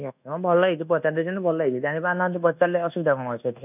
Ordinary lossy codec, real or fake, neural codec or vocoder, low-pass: none; fake; codec, 24 kHz, 1.2 kbps, DualCodec; 3.6 kHz